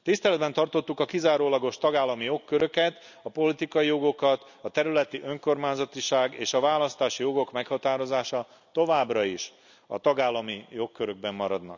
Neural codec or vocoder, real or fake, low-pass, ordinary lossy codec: none; real; 7.2 kHz; none